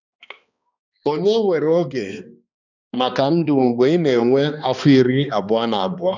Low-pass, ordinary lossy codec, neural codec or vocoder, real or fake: 7.2 kHz; none; codec, 16 kHz, 2 kbps, X-Codec, HuBERT features, trained on balanced general audio; fake